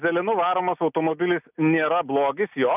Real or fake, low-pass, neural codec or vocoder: real; 3.6 kHz; none